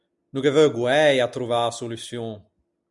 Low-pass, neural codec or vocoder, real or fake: 10.8 kHz; none; real